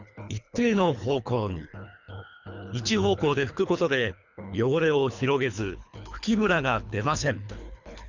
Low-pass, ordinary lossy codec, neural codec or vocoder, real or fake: 7.2 kHz; none; codec, 24 kHz, 3 kbps, HILCodec; fake